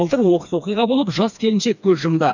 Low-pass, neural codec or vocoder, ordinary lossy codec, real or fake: 7.2 kHz; codec, 16 kHz, 2 kbps, FreqCodec, smaller model; none; fake